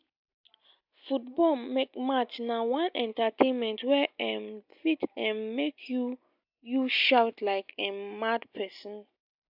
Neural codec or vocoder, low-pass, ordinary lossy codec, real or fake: none; 5.4 kHz; AAC, 48 kbps; real